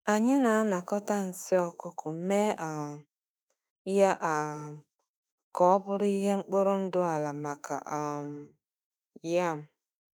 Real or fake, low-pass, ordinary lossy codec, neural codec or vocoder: fake; none; none; autoencoder, 48 kHz, 32 numbers a frame, DAC-VAE, trained on Japanese speech